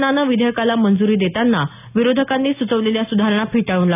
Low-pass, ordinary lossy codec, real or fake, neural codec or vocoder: 3.6 kHz; none; real; none